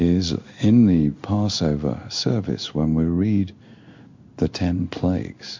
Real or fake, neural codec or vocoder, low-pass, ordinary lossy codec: fake; codec, 16 kHz in and 24 kHz out, 1 kbps, XY-Tokenizer; 7.2 kHz; MP3, 64 kbps